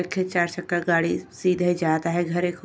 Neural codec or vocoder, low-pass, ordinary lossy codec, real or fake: none; none; none; real